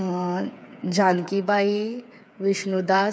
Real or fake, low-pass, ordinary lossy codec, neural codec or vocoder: fake; none; none; codec, 16 kHz, 8 kbps, FreqCodec, smaller model